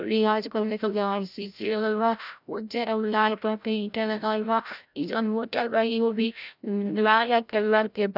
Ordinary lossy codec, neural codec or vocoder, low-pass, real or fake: none; codec, 16 kHz, 0.5 kbps, FreqCodec, larger model; 5.4 kHz; fake